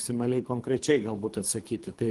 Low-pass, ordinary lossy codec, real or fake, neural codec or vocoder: 10.8 kHz; Opus, 24 kbps; fake; codec, 24 kHz, 3 kbps, HILCodec